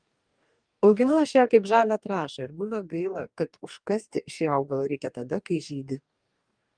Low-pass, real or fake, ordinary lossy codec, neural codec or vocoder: 9.9 kHz; fake; Opus, 32 kbps; codec, 44.1 kHz, 2.6 kbps, DAC